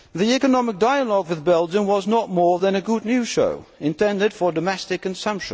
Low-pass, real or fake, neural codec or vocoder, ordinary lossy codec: none; real; none; none